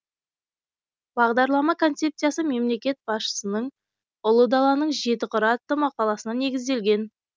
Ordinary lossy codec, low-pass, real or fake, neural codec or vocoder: none; none; real; none